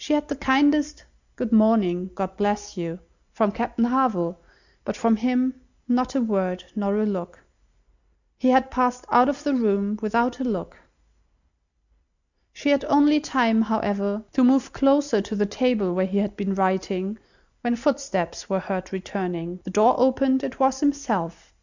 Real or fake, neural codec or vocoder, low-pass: real; none; 7.2 kHz